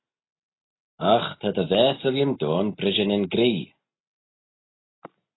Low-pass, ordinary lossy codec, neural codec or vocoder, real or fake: 7.2 kHz; AAC, 16 kbps; none; real